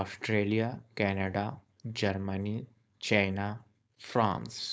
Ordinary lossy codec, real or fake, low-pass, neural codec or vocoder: none; fake; none; codec, 16 kHz, 4.8 kbps, FACodec